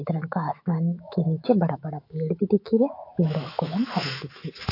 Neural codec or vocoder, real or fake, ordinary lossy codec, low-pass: autoencoder, 48 kHz, 128 numbers a frame, DAC-VAE, trained on Japanese speech; fake; AAC, 32 kbps; 5.4 kHz